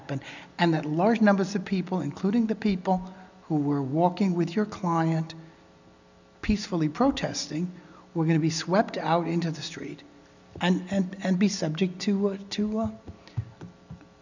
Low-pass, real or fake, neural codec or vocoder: 7.2 kHz; real; none